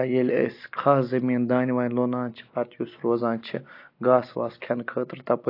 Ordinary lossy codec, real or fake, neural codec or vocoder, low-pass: AAC, 32 kbps; real; none; 5.4 kHz